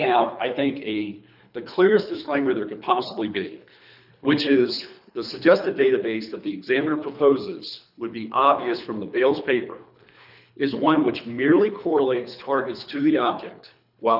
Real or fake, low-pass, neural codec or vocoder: fake; 5.4 kHz; codec, 24 kHz, 3 kbps, HILCodec